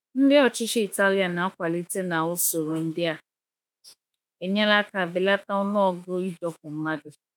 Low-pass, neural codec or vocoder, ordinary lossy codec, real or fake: none; autoencoder, 48 kHz, 32 numbers a frame, DAC-VAE, trained on Japanese speech; none; fake